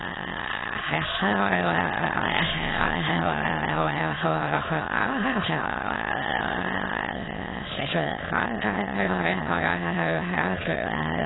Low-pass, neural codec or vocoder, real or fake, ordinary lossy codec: 7.2 kHz; autoencoder, 22.05 kHz, a latent of 192 numbers a frame, VITS, trained on many speakers; fake; AAC, 16 kbps